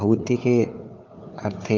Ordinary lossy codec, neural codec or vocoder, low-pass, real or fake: Opus, 24 kbps; codec, 16 kHz, 4 kbps, FunCodec, trained on Chinese and English, 50 frames a second; 7.2 kHz; fake